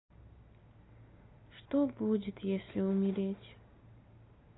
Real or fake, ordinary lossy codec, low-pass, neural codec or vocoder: real; AAC, 16 kbps; 7.2 kHz; none